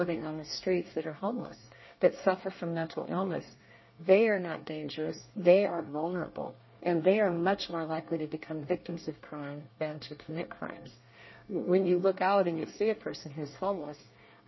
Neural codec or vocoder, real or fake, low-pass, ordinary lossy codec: codec, 24 kHz, 1 kbps, SNAC; fake; 7.2 kHz; MP3, 24 kbps